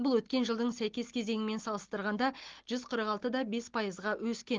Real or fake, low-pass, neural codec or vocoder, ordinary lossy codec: real; 7.2 kHz; none; Opus, 16 kbps